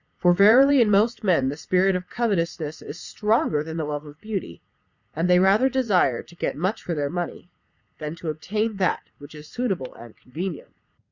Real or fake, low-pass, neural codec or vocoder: fake; 7.2 kHz; vocoder, 44.1 kHz, 80 mel bands, Vocos